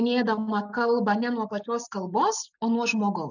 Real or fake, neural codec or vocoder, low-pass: real; none; 7.2 kHz